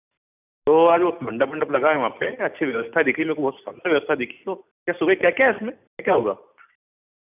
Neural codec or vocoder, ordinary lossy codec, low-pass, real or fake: none; none; 3.6 kHz; real